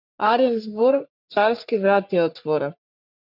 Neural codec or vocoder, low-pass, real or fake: codec, 44.1 kHz, 3.4 kbps, Pupu-Codec; 5.4 kHz; fake